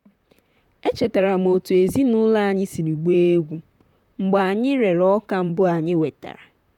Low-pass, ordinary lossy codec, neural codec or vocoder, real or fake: 19.8 kHz; none; vocoder, 44.1 kHz, 128 mel bands, Pupu-Vocoder; fake